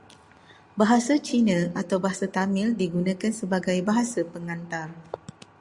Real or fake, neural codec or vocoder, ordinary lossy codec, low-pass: real; none; Opus, 64 kbps; 10.8 kHz